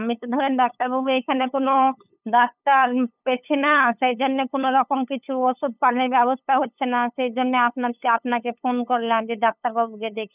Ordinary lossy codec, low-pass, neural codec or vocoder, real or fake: none; 3.6 kHz; codec, 16 kHz, 8 kbps, FunCodec, trained on LibriTTS, 25 frames a second; fake